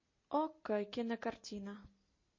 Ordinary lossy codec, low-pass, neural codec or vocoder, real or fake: MP3, 32 kbps; 7.2 kHz; none; real